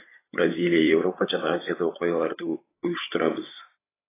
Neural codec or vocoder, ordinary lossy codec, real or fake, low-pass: codec, 16 kHz, 8 kbps, FreqCodec, larger model; AAC, 16 kbps; fake; 3.6 kHz